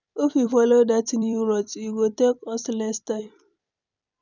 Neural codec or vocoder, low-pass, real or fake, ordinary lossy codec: vocoder, 44.1 kHz, 128 mel bands every 256 samples, BigVGAN v2; 7.2 kHz; fake; none